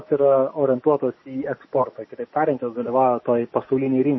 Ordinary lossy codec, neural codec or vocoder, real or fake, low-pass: MP3, 24 kbps; none; real; 7.2 kHz